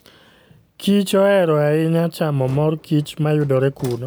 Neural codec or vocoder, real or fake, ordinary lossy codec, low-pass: none; real; none; none